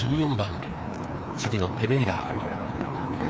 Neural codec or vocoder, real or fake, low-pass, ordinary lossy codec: codec, 16 kHz, 2 kbps, FreqCodec, larger model; fake; none; none